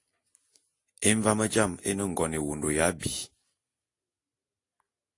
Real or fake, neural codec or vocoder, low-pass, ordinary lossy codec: real; none; 10.8 kHz; AAC, 48 kbps